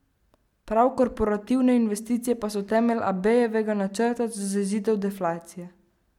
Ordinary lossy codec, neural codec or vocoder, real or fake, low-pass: MP3, 96 kbps; none; real; 19.8 kHz